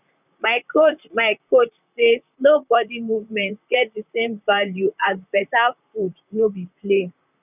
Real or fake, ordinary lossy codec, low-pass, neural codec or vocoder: fake; none; 3.6 kHz; vocoder, 44.1 kHz, 128 mel bands, Pupu-Vocoder